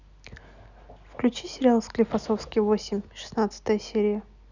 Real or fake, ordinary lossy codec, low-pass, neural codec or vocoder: real; none; 7.2 kHz; none